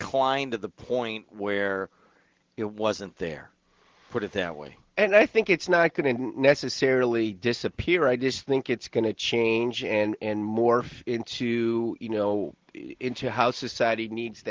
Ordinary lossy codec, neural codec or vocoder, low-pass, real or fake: Opus, 16 kbps; none; 7.2 kHz; real